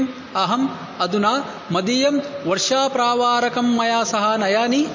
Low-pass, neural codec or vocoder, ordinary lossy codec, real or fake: 7.2 kHz; none; MP3, 32 kbps; real